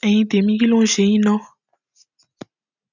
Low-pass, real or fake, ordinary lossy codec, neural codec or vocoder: 7.2 kHz; real; none; none